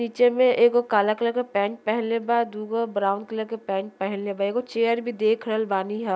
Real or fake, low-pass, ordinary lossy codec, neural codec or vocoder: real; none; none; none